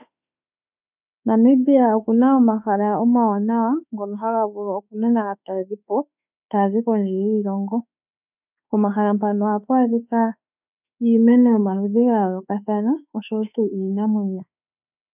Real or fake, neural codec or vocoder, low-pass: fake; autoencoder, 48 kHz, 32 numbers a frame, DAC-VAE, trained on Japanese speech; 3.6 kHz